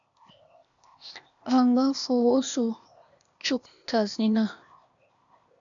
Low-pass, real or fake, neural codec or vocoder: 7.2 kHz; fake; codec, 16 kHz, 0.8 kbps, ZipCodec